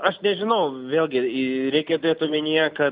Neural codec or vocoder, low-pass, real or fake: none; 5.4 kHz; real